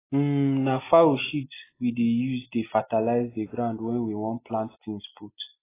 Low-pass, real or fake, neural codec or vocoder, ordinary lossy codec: 3.6 kHz; real; none; AAC, 16 kbps